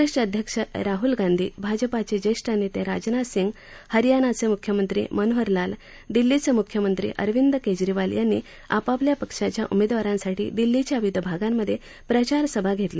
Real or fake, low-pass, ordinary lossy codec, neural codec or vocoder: real; none; none; none